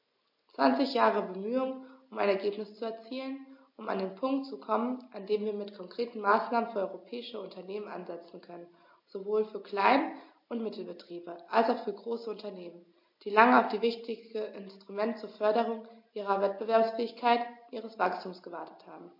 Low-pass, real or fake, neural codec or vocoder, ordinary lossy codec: 5.4 kHz; real; none; MP3, 32 kbps